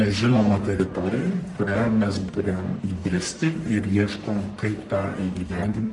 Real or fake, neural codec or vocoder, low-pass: fake; codec, 44.1 kHz, 1.7 kbps, Pupu-Codec; 10.8 kHz